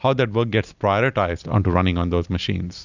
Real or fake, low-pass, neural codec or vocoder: real; 7.2 kHz; none